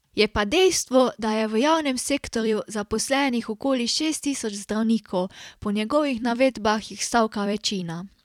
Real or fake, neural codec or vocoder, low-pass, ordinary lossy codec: fake; vocoder, 44.1 kHz, 128 mel bands every 256 samples, BigVGAN v2; 19.8 kHz; none